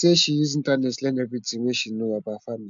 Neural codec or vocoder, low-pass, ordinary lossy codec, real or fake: none; 7.2 kHz; MP3, 64 kbps; real